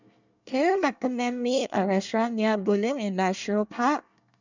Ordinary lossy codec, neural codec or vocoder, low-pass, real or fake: none; codec, 24 kHz, 1 kbps, SNAC; 7.2 kHz; fake